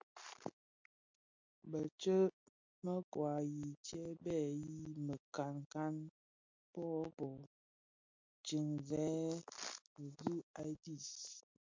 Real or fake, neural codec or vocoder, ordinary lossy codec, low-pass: real; none; MP3, 48 kbps; 7.2 kHz